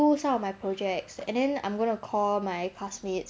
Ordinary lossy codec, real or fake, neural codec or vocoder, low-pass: none; real; none; none